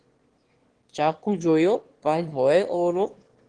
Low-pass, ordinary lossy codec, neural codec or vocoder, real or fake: 9.9 kHz; Opus, 16 kbps; autoencoder, 22.05 kHz, a latent of 192 numbers a frame, VITS, trained on one speaker; fake